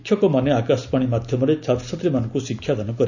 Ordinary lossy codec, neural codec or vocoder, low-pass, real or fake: none; none; 7.2 kHz; real